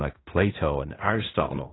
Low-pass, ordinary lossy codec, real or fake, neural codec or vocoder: 7.2 kHz; AAC, 16 kbps; fake; codec, 16 kHz in and 24 kHz out, 0.4 kbps, LongCat-Audio-Codec, fine tuned four codebook decoder